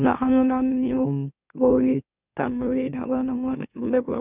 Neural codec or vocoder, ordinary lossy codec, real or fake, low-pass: autoencoder, 44.1 kHz, a latent of 192 numbers a frame, MeloTTS; none; fake; 3.6 kHz